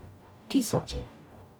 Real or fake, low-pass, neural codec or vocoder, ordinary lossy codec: fake; none; codec, 44.1 kHz, 0.9 kbps, DAC; none